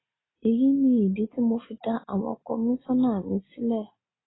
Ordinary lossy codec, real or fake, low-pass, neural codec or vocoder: AAC, 16 kbps; real; 7.2 kHz; none